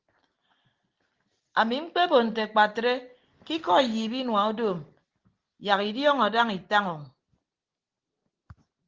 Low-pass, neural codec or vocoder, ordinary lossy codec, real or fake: 7.2 kHz; none; Opus, 16 kbps; real